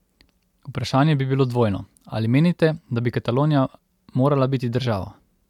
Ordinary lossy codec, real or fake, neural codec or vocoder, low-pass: MP3, 96 kbps; real; none; 19.8 kHz